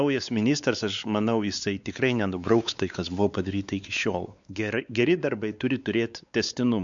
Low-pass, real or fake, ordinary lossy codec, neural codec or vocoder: 7.2 kHz; fake; Opus, 64 kbps; codec, 16 kHz, 4 kbps, X-Codec, WavLM features, trained on Multilingual LibriSpeech